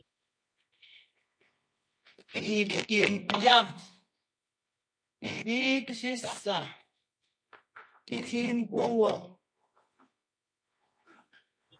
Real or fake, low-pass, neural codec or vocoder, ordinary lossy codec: fake; 9.9 kHz; codec, 24 kHz, 0.9 kbps, WavTokenizer, medium music audio release; MP3, 48 kbps